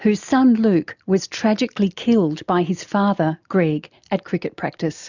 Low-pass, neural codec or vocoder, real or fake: 7.2 kHz; none; real